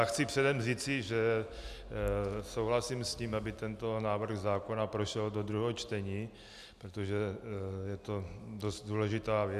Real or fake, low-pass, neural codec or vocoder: real; 14.4 kHz; none